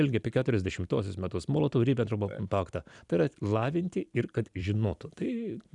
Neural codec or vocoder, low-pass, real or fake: vocoder, 44.1 kHz, 128 mel bands every 256 samples, BigVGAN v2; 10.8 kHz; fake